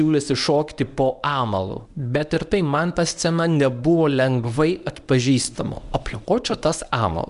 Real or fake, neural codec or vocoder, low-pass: fake; codec, 24 kHz, 0.9 kbps, WavTokenizer, medium speech release version 1; 10.8 kHz